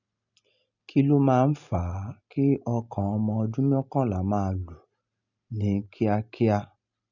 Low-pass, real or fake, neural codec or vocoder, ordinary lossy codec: 7.2 kHz; real; none; none